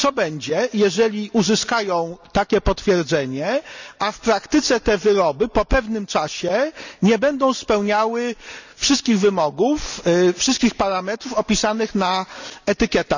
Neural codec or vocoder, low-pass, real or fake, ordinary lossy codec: none; 7.2 kHz; real; none